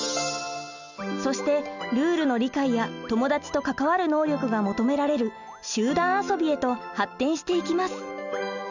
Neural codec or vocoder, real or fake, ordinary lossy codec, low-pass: none; real; none; 7.2 kHz